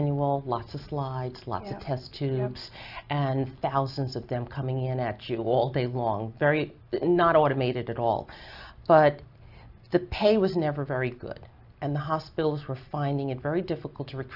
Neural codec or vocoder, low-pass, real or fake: none; 5.4 kHz; real